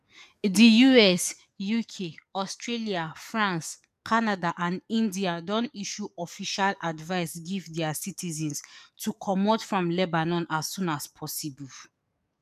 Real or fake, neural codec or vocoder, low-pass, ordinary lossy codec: fake; codec, 44.1 kHz, 7.8 kbps, DAC; 14.4 kHz; none